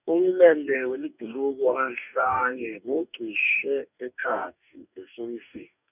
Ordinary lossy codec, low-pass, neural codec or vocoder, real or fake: none; 3.6 kHz; codec, 44.1 kHz, 2.6 kbps, DAC; fake